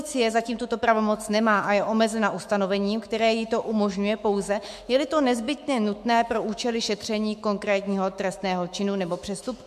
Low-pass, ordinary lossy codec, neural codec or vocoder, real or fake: 14.4 kHz; MP3, 64 kbps; autoencoder, 48 kHz, 128 numbers a frame, DAC-VAE, trained on Japanese speech; fake